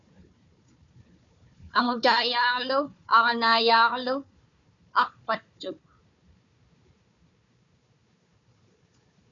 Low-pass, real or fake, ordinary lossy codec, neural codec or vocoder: 7.2 kHz; fake; MP3, 96 kbps; codec, 16 kHz, 4 kbps, FunCodec, trained on Chinese and English, 50 frames a second